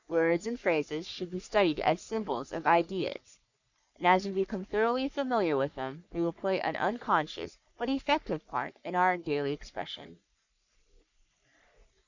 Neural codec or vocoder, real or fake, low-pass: codec, 44.1 kHz, 3.4 kbps, Pupu-Codec; fake; 7.2 kHz